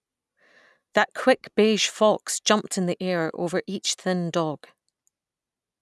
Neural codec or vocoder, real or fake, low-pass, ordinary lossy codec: none; real; none; none